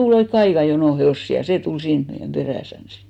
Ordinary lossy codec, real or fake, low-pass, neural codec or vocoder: MP3, 96 kbps; real; 19.8 kHz; none